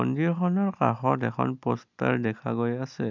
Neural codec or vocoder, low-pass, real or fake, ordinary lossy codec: none; 7.2 kHz; real; none